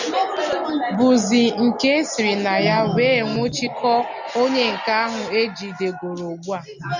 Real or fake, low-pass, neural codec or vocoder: real; 7.2 kHz; none